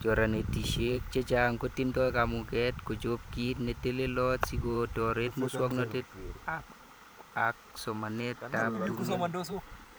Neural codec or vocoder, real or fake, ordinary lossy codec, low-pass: vocoder, 44.1 kHz, 128 mel bands every 512 samples, BigVGAN v2; fake; none; none